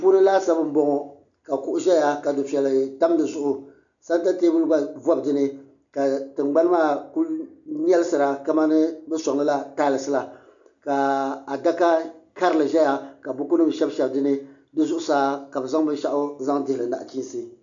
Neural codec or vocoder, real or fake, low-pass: none; real; 7.2 kHz